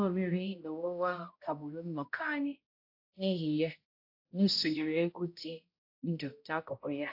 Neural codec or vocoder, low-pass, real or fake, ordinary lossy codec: codec, 16 kHz, 0.5 kbps, X-Codec, HuBERT features, trained on balanced general audio; 5.4 kHz; fake; AAC, 48 kbps